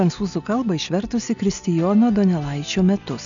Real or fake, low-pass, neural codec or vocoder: real; 7.2 kHz; none